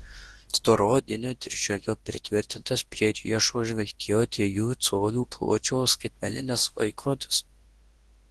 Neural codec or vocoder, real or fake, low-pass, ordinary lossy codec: codec, 24 kHz, 0.9 kbps, WavTokenizer, large speech release; fake; 10.8 kHz; Opus, 16 kbps